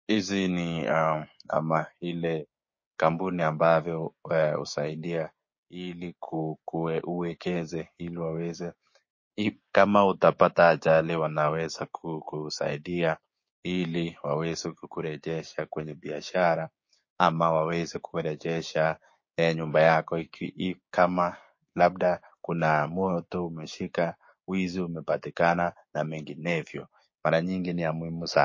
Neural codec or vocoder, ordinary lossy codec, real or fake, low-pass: codec, 16 kHz, 6 kbps, DAC; MP3, 32 kbps; fake; 7.2 kHz